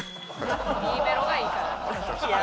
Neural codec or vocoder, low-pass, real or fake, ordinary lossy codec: none; none; real; none